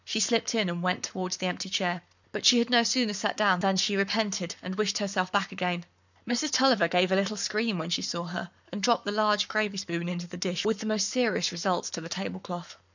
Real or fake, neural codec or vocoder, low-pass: fake; codec, 44.1 kHz, 7.8 kbps, Pupu-Codec; 7.2 kHz